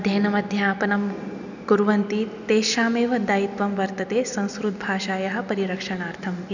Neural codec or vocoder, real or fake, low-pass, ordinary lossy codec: none; real; 7.2 kHz; none